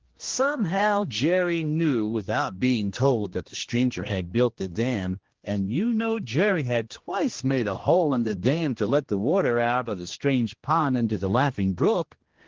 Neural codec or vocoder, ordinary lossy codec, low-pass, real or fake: codec, 16 kHz, 1 kbps, X-Codec, HuBERT features, trained on general audio; Opus, 16 kbps; 7.2 kHz; fake